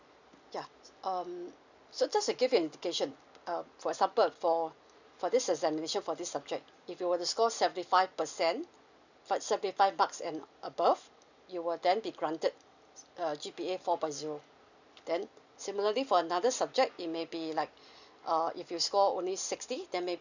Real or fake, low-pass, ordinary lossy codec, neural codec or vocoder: real; 7.2 kHz; none; none